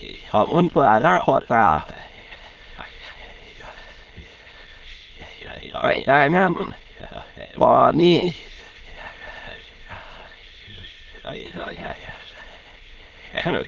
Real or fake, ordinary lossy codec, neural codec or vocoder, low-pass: fake; Opus, 16 kbps; autoencoder, 22.05 kHz, a latent of 192 numbers a frame, VITS, trained on many speakers; 7.2 kHz